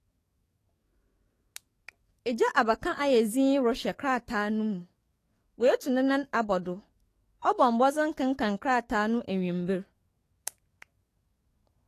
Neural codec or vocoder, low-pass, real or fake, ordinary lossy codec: codec, 44.1 kHz, 7.8 kbps, DAC; 14.4 kHz; fake; AAC, 48 kbps